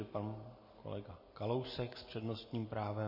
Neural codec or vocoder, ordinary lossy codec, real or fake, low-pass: none; MP3, 24 kbps; real; 5.4 kHz